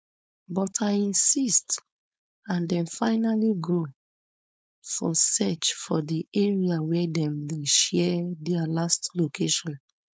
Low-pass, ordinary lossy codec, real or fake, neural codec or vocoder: none; none; fake; codec, 16 kHz, 4.8 kbps, FACodec